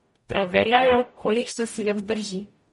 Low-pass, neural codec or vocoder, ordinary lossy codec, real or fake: 19.8 kHz; codec, 44.1 kHz, 0.9 kbps, DAC; MP3, 48 kbps; fake